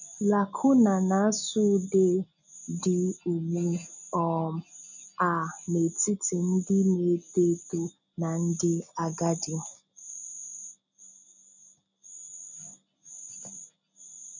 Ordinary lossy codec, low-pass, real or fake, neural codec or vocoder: none; 7.2 kHz; real; none